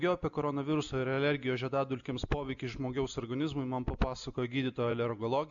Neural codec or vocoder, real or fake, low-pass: none; real; 7.2 kHz